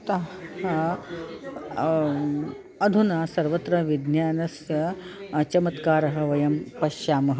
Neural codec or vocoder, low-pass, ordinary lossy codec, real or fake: none; none; none; real